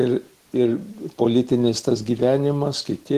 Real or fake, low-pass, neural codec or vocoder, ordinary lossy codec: real; 14.4 kHz; none; Opus, 16 kbps